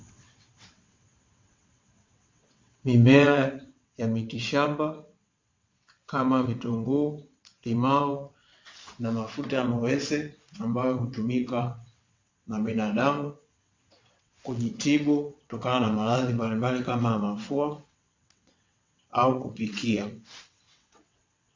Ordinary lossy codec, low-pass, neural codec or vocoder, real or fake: MP3, 48 kbps; 7.2 kHz; vocoder, 22.05 kHz, 80 mel bands, WaveNeXt; fake